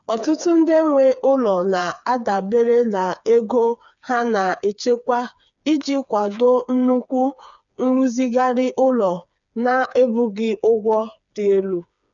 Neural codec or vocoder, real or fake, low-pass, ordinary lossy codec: codec, 16 kHz, 4 kbps, FreqCodec, smaller model; fake; 7.2 kHz; none